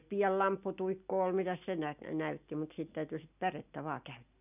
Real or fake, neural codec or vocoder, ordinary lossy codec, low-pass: real; none; none; 3.6 kHz